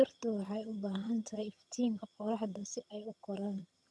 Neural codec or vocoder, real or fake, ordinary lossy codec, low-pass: vocoder, 22.05 kHz, 80 mel bands, HiFi-GAN; fake; none; none